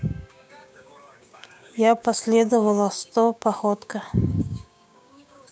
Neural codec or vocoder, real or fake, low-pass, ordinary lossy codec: codec, 16 kHz, 6 kbps, DAC; fake; none; none